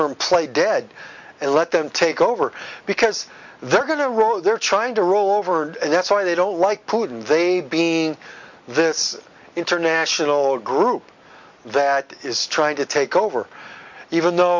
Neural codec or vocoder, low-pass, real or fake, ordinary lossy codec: none; 7.2 kHz; real; MP3, 48 kbps